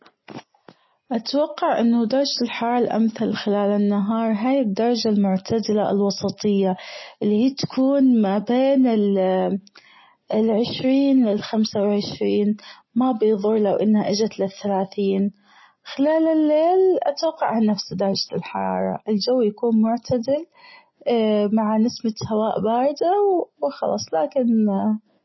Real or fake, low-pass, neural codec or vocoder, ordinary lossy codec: real; 7.2 kHz; none; MP3, 24 kbps